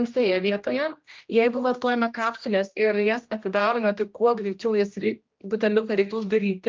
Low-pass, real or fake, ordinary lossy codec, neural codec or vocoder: 7.2 kHz; fake; Opus, 24 kbps; codec, 16 kHz, 1 kbps, X-Codec, HuBERT features, trained on general audio